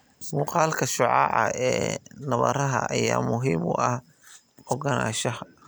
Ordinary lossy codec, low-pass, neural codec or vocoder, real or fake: none; none; none; real